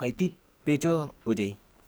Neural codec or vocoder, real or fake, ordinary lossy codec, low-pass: codec, 44.1 kHz, 2.6 kbps, SNAC; fake; none; none